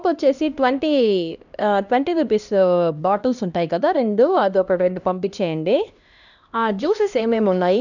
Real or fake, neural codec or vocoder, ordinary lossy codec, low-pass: fake; codec, 16 kHz, 1 kbps, X-Codec, HuBERT features, trained on LibriSpeech; none; 7.2 kHz